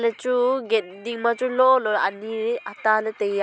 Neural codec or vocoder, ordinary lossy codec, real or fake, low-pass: none; none; real; none